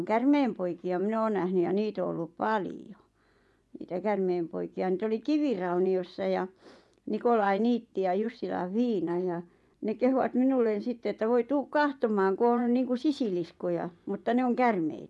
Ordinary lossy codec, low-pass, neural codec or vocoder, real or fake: none; none; vocoder, 24 kHz, 100 mel bands, Vocos; fake